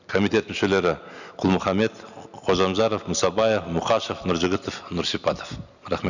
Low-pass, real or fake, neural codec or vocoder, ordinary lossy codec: 7.2 kHz; real; none; none